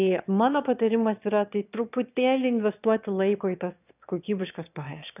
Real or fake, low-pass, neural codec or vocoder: fake; 3.6 kHz; autoencoder, 22.05 kHz, a latent of 192 numbers a frame, VITS, trained on one speaker